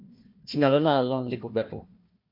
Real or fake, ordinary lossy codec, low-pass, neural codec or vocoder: fake; MP3, 48 kbps; 5.4 kHz; codec, 16 kHz, 1 kbps, FunCodec, trained on Chinese and English, 50 frames a second